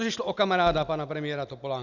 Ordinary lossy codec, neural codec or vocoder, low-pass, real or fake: Opus, 64 kbps; none; 7.2 kHz; real